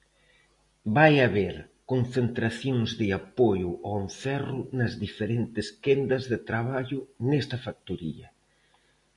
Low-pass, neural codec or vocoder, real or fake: 10.8 kHz; none; real